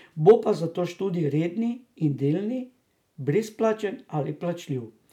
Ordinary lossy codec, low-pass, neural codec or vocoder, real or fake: none; 19.8 kHz; none; real